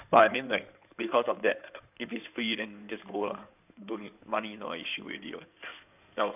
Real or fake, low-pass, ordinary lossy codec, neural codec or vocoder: fake; 3.6 kHz; none; codec, 16 kHz in and 24 kHz out, 2.2 kbps, FireRedTTS-2 codec